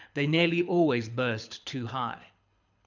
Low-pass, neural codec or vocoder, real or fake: 7.2 kHz; codec, 24 kHz, 6 kbps, HILCodec; fake